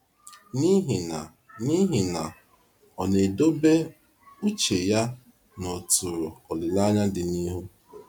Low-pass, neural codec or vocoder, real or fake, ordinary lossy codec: none; none; real; none